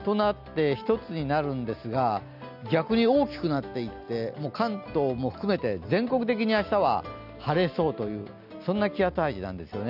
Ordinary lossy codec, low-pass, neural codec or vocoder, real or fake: none; 5.4 kHz; none; real